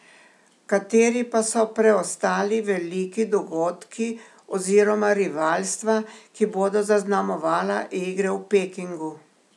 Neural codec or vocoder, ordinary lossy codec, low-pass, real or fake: none; none; none; real